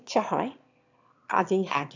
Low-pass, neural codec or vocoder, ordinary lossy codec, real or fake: 7.2 kHz; autoencoder, 22.05 kHz, a latent of 192 numbers a frame, VITS, trained on one speaker; none; fake